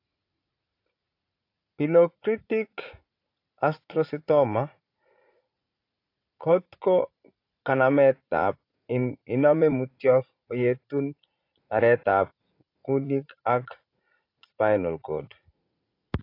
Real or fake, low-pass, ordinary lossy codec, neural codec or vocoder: fake; 5.4 kHz; AAC, 32 kbps; vocoder, 44.1 kHz, 80 mel bands, Vocos